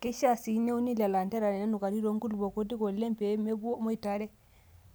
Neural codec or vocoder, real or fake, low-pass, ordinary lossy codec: none; real; none; none